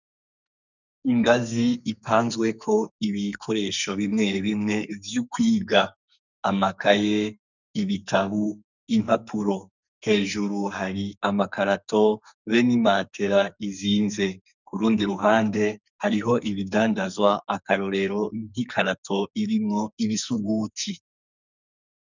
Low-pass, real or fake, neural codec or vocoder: 7.2 kHz; fake; codec, 44.1 kHz, 2.6 kbps, SNAC